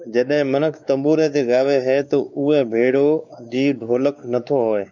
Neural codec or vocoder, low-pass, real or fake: codec, 16 kHz, 4 kbps, X-Codec, WavLM features, trained on Multilingual LibriSpeech; 7.2 kHz; fake